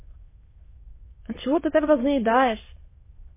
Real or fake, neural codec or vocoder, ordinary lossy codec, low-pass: fake; autoencoder, 22.05 kHz, a latent of 192 numbers a frame, VITS, trained on many speakers; MP3, 16 kbps; 3.6 kHz